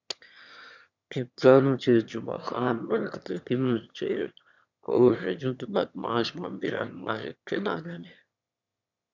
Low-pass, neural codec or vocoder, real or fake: 7.2 kHz; autoencoder, 22.05 kHz, a latent of 192 numbers a frame, VITS, trained on one speaker; fake